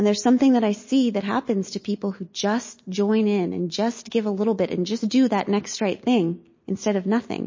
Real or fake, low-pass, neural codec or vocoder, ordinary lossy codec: real; 7.2 kHz; none; MP3, 32 kbps